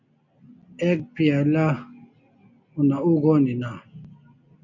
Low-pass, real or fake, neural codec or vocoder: 7.2 kHz; real; none